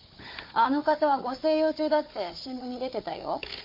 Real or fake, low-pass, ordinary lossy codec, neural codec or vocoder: fake; 5.4 kHz; MP3, 32 kbps; codec, 16 kHz, 4 kbps, FunCodec, trained on Chinese and English, 50 frames a second